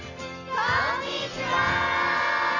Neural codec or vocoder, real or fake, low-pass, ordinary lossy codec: none; real; 7.2 kHz; none